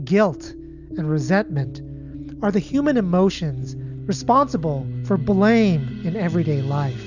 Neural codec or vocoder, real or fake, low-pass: none; real; 7.2 kHz